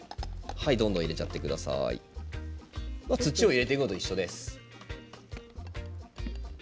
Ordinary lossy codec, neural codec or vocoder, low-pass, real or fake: none; none; none; real